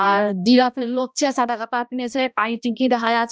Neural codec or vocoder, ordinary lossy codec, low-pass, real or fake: codec, 16 kHz, 1 kbps, X-Codec, HuBERT features, trained on balanced general audio; none; none; fake